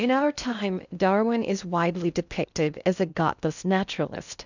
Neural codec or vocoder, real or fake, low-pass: codec, 16 kHz in and 24 kHz out, 0.6 kbps, FocalCodec, streaming, 2048 codes; fake; 7.2 kHz